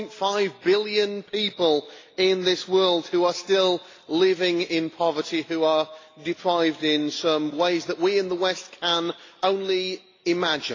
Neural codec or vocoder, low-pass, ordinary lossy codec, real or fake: none; 7.2 kHz; AAC, 32 kbps; real